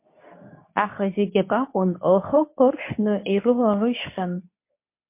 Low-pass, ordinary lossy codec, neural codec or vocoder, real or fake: 3.6 kHz; MP3, 24 kbps; codec, 24 kHz, 0.9 kbps, WavTokenizer, medium speech release version 2; fake